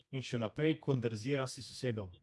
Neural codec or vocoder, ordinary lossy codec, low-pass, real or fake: codec, 24 kHz, 0.9 kbps, WavTokenizer, medium music audio release; none; none; fake